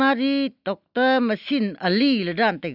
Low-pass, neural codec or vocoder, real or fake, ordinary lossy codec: 5.4 kHz; none; real; none